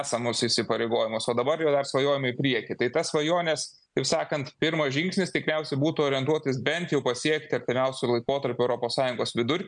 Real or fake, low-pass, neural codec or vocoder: real; 9.9 kHz; none